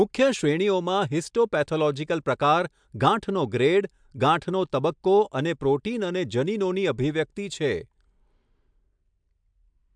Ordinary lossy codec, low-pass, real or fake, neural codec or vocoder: MP3, 96 kbps; 9.9 kHz; real; none